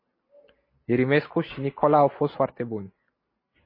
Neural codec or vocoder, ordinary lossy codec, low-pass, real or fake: none; MP3, 24 kbps; 5.4 kHz; real